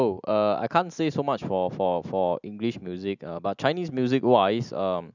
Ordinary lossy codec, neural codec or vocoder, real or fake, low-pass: none; none; real; 7.2 kHz